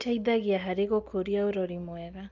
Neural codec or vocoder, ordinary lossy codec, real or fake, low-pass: none; Opus, 24 kbps; real; 7.2 kHz